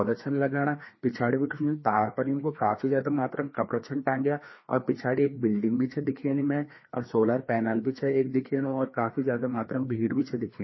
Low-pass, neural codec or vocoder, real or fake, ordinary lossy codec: 7.2 kHz; codec, 16 kHz, 2 kbps, FreqCodec, larger model; fake; MP3, 24 kbps